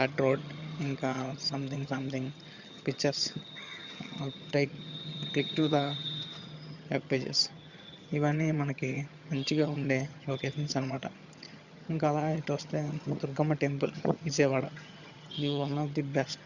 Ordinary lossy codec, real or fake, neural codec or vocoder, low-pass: Opus, 64 kbps; fake; vocoder, 22.05 kHz, 80 mel bands, HiFi-GAN; 7.2 kHz